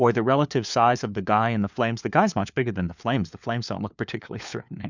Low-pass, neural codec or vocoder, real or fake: 7.2 kHz; codec, 16 kHz, 4 kbps, FunCodec, trained on LibriTTS, 50 frames a second; fake